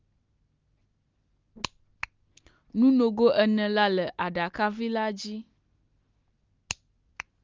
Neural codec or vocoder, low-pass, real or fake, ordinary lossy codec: none; 7.2 kHz; real; Opus, 24 kbps